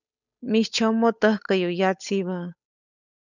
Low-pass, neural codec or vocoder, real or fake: 7.2 kHz; codec, 16 kHz, 8 kbps, FunCodec, trained on Chinese and English, 25 frames a second; fake